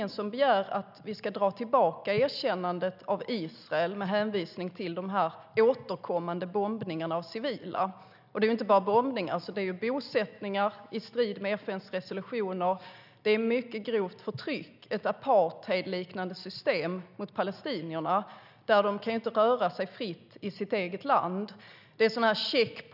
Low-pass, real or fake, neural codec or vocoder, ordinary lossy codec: 5.4 kHz; real; none; none